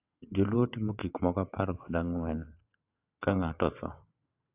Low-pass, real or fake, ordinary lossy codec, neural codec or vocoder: 3.6 kHz; fake; AAC, 32 kbps; vocoder, 22.05 kHz, 80 mel bands, WaveNeXt